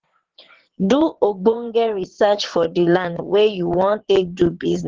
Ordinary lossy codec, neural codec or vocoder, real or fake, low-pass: Opus, 16 kbps; vocoder, 22.05 kHz, 80 mel bands, WaveNeXt; fake; 7.2 kHz